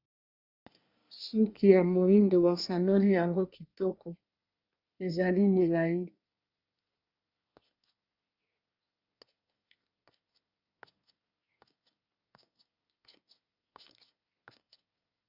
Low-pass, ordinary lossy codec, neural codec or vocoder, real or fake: 5.4 kHz; Opus, 64 kbps; codec, 24 kHz, 1 kbps, SNAC; fake